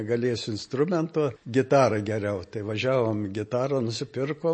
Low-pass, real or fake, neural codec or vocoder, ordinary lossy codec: 9.9 kHz; real; none; MP3, 32 kbps